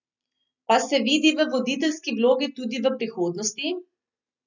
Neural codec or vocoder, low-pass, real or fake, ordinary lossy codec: none; 7.2 kHz; real; none